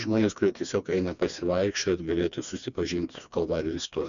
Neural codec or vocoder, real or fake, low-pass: codec, 16 kHz, 2 kbps, FreqCodec, smaller model; fake; 7.2 kHz